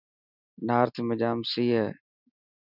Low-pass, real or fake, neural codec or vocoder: 5.4 kHz; real; none